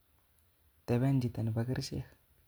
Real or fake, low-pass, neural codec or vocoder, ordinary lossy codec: real; none; none; none